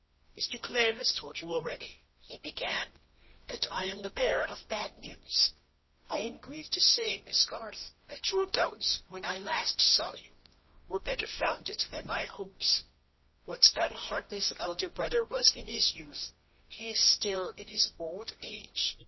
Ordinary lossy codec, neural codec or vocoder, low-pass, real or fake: MP3, 24 kbps; codec, 24 kHz, 0.9 kbps, WavTokenizer, medium music audio release; 7.2 kHz; fake